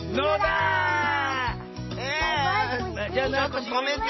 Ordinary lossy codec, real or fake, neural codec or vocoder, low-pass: MP3, 24 kbps; real; none; 7.2 kHz